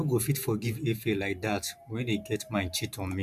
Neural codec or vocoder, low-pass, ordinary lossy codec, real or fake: vocoder, 44.1 kHz, 128 mel bands every 512 samples, BigVGAN v2; 14.4 kHz; none; fake